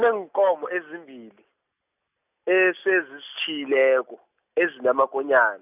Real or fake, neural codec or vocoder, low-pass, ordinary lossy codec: real; none; 3.6 kHz; none